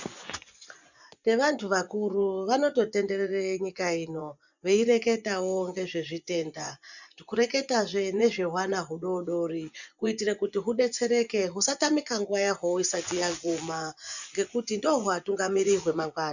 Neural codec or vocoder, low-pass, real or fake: vocoder, 24 kHz, 100 mel bands, Vocos; 7.2 kHz; fake